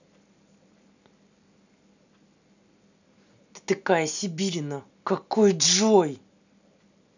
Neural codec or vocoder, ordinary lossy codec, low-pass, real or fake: none; none; 7.2 kHz; real